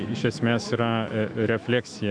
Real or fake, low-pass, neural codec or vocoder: fake; 9.9 kHz; autoencoder, 48 kHz, 128 numbers a frame, DAC-VAE, trained on Japanese speech